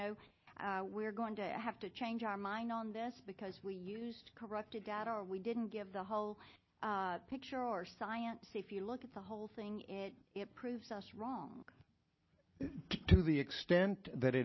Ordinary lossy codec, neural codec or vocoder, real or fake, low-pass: MP3, 24 kbps; none; real; 7.2 kHz